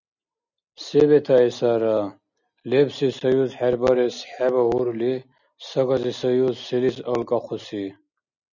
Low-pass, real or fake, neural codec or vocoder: 7.2 kHz; real; none